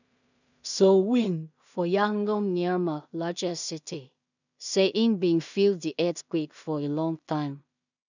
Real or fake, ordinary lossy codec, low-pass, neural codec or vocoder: fake; none; 7.2 kHz; codec, 16 kHz in and 24 kHz out, 0.4 kbps, LongCat-Audio-Codec, two codebook decoder